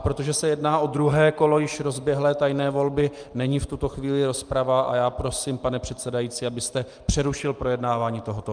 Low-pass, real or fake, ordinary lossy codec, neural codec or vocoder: 9.9 kHz; real; Opus, 32 kbps; none